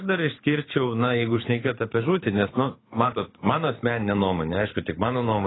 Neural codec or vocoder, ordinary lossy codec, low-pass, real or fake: codec, 24 kHz, 6 kbps, HILCodec; AAC, 16 kbps; 7.2 kHz; fake